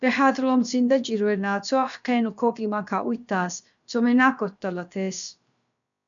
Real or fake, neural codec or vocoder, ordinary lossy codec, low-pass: fake; codec, 16 kHz, about 1 kbps, DyCAST, with the encoder's durations; MP3, 96 kbps; 7.2 kHz